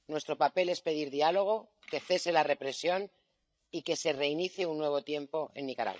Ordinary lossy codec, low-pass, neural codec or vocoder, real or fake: none; none; codec, 16 kHz, 16 kbps, FreqCodec, larger model; fake